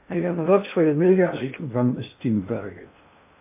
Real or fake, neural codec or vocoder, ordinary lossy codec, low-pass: fake; codec, 16 kHz in and 24 kHz out, 0.6 kbps, FocalCodec, streaming, 2048 codes; MP3, 24 kbps; 3.6 kHz